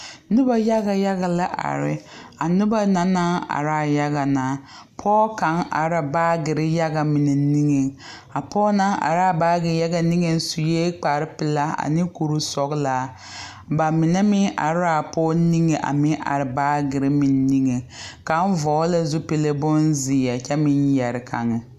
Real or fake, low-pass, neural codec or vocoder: real; 14.4 kHz; none